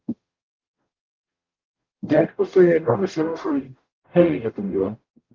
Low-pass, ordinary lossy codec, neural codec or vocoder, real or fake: 7.2 kHz; Opus, 32 kbps; codec, 44.1 kHz, 0.9 kbps, DAC; fake